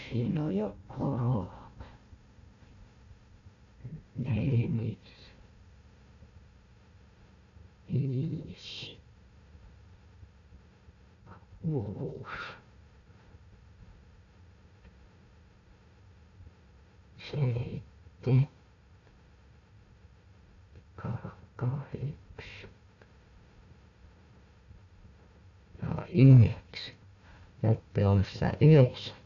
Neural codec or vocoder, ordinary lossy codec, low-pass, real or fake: codec, 16 kHz, 1 kbps, FunCodec, trained on Chinese and English, 50 frames a second; none; 7.2 kHz; fake